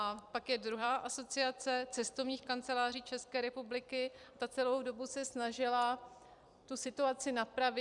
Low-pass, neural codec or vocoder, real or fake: 10.8 kHz; vocoder, 44.1 kHz, 128 mel bands every 256 samples, BigVGAN v2; fake